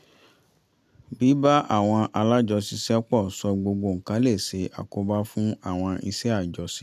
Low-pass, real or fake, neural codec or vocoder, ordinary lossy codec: 14.4 kHz; real; none; none